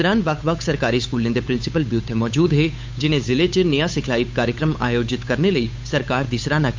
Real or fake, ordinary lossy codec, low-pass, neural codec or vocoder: fake; MP3, 48 kbps; 7.2 kHz; codec, 16 kHz, 8 kbps, FunCodec, trained on Chinese and English, 25 frames a second